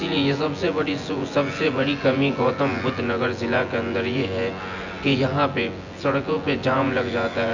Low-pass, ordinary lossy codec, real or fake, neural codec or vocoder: 7.2 kHz; Opus, 64 kbps; fake; vocoder, 24 kHz, 100 mel bands, Vocos